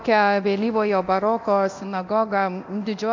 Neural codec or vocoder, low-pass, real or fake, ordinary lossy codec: codec, 24 kHz, 0.9 kbps, DualCodec; 7.2 kHz; fake; MP3, 64 kbps